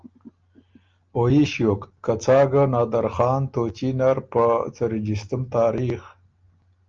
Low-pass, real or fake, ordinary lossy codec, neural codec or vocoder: 7.2 kHz; real; Opus, 24 kbps; none